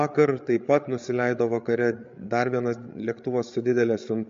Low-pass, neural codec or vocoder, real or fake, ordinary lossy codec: 7.2 kHz; codec, 16 kHz, 8 kbps, FreqCodec, larger model; fake; MP3, 48 kbps